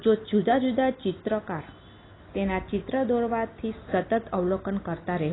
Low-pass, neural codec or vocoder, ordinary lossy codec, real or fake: 7.2 kHz; none; AAC, 16 kbps; real